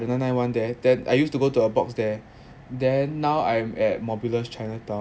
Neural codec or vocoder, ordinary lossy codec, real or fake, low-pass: none; none; real; none